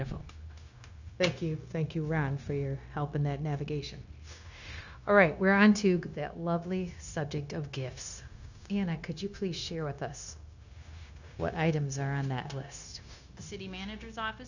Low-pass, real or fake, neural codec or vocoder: 7.2 kHz; fake; codec, 16 kHz, 0.9 kbps, LongCat-Audio-Codec